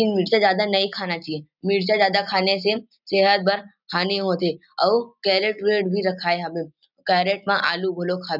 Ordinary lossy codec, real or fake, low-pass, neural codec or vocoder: none; real; 5.4 kHz; none